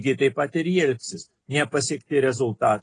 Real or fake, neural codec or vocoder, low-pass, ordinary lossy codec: real; none; 9.9 kHz; AAC, 32 kbps